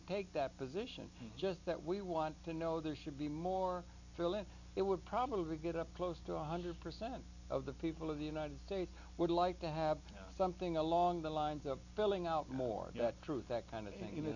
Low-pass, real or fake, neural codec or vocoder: 7.2 kHz; real; none